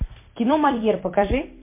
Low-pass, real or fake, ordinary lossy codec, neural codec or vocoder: 3.6 kHz; fake; MP3, 24 kbps; vocoder, 44.1 kHz, 128 mel bands every 256 samples, BigVGAN v2